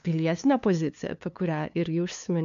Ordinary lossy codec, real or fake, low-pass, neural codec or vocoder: MP3, 64 kbps; fake; 7.2 kHz; codec, 16 kHz, 2 kbps, FunCodec, trained on LibriTTS, 25 frames a second